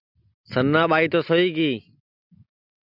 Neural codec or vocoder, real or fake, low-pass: none; real; 5.4 kHz